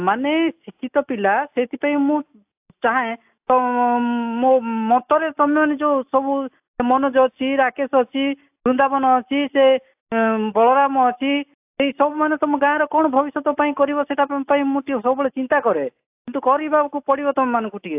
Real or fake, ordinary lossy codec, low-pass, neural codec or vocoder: real; AAC, 32 kbps; 3.6 kHz; none